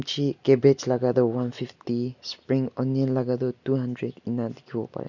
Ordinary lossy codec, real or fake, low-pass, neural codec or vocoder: none; real; 7.2 kHz; none